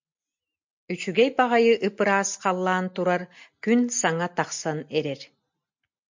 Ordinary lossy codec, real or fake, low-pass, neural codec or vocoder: MP3, 48 kbps; real; 7.2 kHz; none